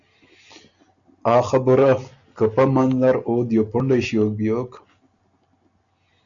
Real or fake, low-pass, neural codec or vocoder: real; 7.2 kHz; none